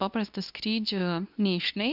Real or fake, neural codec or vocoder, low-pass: fake; codec, 24 kHz, 0.9 kbps, WavTokenizer, medium speech release version 2; 5.4 kHz